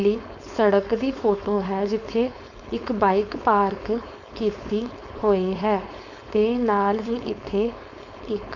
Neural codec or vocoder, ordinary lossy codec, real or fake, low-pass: codec, 16 kHz, 4.8 kbps, FACodec; none; fake; 7.2 kHz